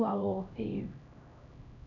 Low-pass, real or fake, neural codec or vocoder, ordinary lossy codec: 7.2 kHz; fake; codec, 16 kHz, 1 kbps, X-Codec, HuBERT features, trained on LibriSpeech; none